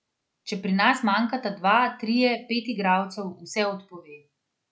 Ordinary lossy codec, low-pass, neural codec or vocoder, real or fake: none; none; none; real